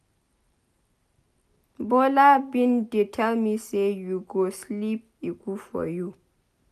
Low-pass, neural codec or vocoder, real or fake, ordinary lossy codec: 14.4 kHz; none; real; none